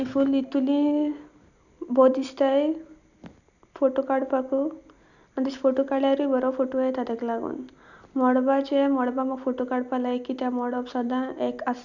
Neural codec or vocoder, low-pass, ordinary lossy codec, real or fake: none; 7.2 kHz; none; real